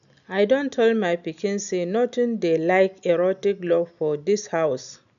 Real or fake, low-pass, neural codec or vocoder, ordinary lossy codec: real; 7.2 kHz; none; MP3, 96 kbps